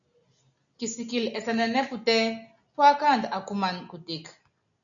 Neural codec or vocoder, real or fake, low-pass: none; real; 7.2 kHz